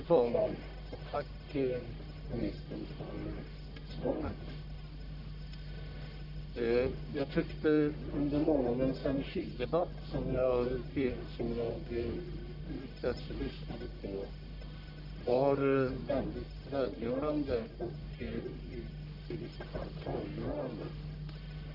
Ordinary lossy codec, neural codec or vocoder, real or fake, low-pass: none; codec, 44.1 kHz, 1.7 kbps, Pupu-Codec; fake; 5.4 kHz